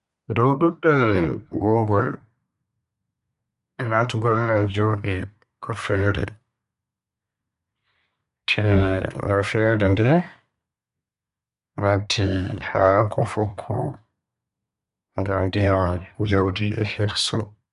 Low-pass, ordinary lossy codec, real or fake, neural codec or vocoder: 10.8 kHz; none; fake; codec, 24 kHz, 1 kbps, SNAC